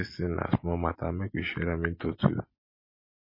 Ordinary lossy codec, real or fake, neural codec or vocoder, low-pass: MP3, 24 kbps; real; none; 5.4 kHz